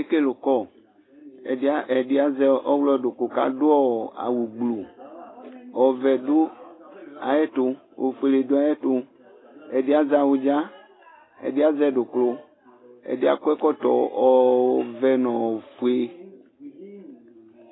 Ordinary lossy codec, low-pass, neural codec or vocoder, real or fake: AAC, 16 kbps; 7.2 kHz; none; real